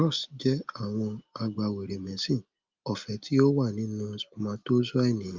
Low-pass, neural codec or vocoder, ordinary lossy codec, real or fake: 7.2 kHz; none; Opus, 24 kbps; real